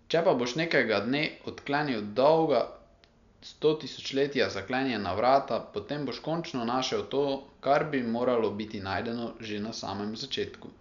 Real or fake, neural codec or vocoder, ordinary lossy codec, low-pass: real; none; none; 7.2 kHz